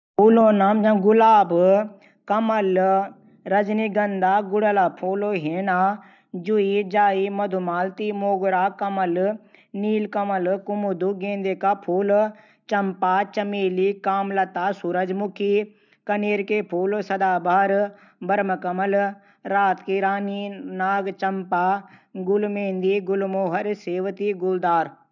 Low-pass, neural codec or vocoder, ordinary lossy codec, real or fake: 7.2 kHz; none; none; real